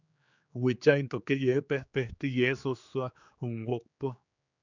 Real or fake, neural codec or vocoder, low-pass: fake; codec, 16 kHz, 4 kbps, X-Codec, HuBERT features, trained on general audio; 7.2 kHz